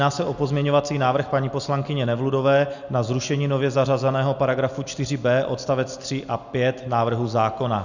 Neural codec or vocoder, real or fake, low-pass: none; real; 7.2 kHz